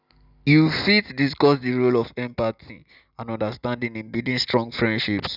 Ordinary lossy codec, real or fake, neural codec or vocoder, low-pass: none; real; none; 5.4 kHz